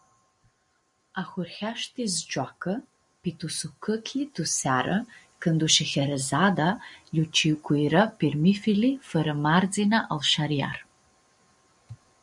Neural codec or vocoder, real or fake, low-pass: none; real; 10.8 kHz